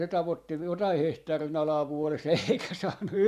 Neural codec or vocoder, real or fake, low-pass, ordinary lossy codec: vocoder, 44.1 kHz, 128 mel bands every 512 samples, BigVGAN v2; fake; 14.4 kHz; MP3, 96 kbps